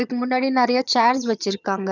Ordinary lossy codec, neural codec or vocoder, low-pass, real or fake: none; vocoder, 22.05 kHz, 80 mel bands, HiFi-GAN; 7.2 kHz; fake